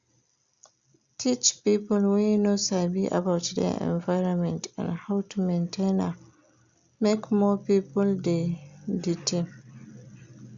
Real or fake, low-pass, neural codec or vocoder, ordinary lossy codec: real; 7.2 kHz; none; Opus, 64 kbps